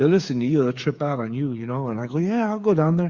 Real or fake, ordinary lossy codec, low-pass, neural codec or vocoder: fake; Opus, 64 kbps; 7.2 kHz; codec, 16 kHz, 8 kbps, FreqCodec, smaller model